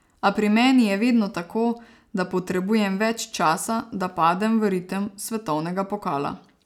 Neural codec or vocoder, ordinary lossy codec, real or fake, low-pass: none; none; real; 19.8 kHz